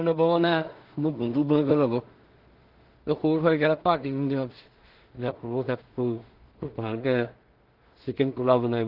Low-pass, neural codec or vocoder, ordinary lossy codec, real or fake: 5.4 kHz; codec, 16 kHz in and 24 kHz out, 0.4 kbps, LongCat-Audio-Codec, two codebook decoder; Opus, 16 kbps; fake